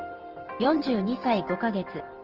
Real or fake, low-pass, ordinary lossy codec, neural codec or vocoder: real; 5.4 kHz; Opus, 16 kbps; none